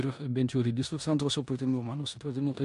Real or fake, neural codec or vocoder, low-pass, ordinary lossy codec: fake; codec, 16 kHz in and 24 kHz out, 0.9 kbps, LongCat-Audio-Codec, four codebook decoder; 10.8 kHz; MP3, 64 kbps